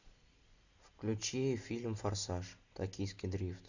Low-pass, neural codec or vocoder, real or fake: 7.2 kHz; none; real